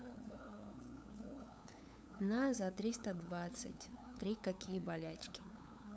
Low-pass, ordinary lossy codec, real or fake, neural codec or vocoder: none; none; fake; codec, 16 kHz, 8 kbps, FunCodec, trained on LibriTTS, 25 frames a second